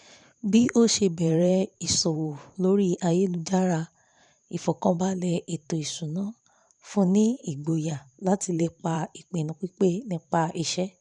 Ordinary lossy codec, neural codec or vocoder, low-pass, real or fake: none; vocoder, 44.1 kHz, 128 mel bands every 512 samples, BigVGAN v2; 10.8 kHz; fake